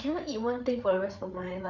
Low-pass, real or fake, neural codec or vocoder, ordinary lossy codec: 7.2 kHz; fake; codec, 16 kHz, 8 kbps, FreqCodec, larger model; none